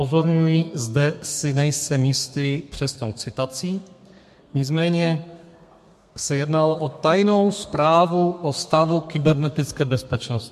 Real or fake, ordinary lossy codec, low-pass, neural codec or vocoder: fake; MP3, 64 kbps; 14.4 kHz; codec, 32 kHz, 1.9 kbps, SNAC